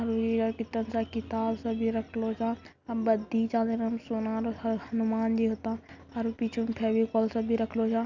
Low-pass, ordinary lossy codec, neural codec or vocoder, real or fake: 7.2 kHz; none; none; real